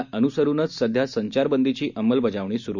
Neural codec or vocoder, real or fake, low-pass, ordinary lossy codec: none; real; 7.2 kHz; none